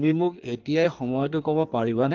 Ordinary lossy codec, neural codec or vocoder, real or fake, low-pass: Opus, 32 kbps; codec, 16 kHz in and 24 kHz out, 1.1 kbps, FireRedTTS-2 codec; fake; 7.2 kHz